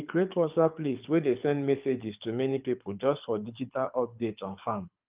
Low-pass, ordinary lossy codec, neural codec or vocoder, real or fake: 3.6 kHz; Opus, 24 kbps; codec, 24 kHz, 6 kbps, HILCodec; fake